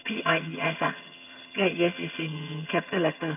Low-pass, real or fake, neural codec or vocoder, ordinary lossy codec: 3.6 kHz; fake; vocoder, 22.05 kHz, 80 mel bands, HiFi-GAN; none